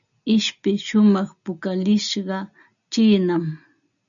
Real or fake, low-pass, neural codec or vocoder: real; 7.2 kHz; none